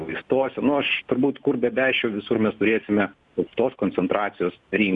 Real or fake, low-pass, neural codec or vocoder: real; 10.8 kHz; none